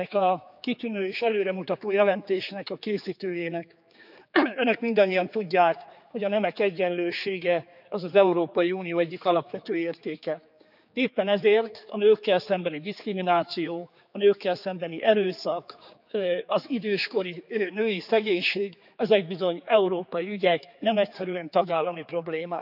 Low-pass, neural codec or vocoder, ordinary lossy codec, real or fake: 5.4 kHz; codec, 16 kHz, 4 kbps, X-Codec, HuBERT features, trained on general audio; none; fake